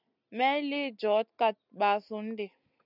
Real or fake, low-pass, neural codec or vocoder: real; 5.4 kHz; none